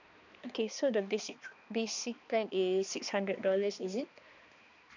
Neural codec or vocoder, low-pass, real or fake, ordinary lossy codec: codec, 16 kHz, 2 kbps, X-Codec, HuBERT features, trained on balanced general audio; 7.2 kHz; fake; none